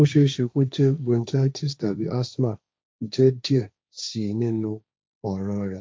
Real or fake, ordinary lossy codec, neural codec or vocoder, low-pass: fake; none; codec, 16 kHz, 1.1 kbps, Voila-Tokenizer; none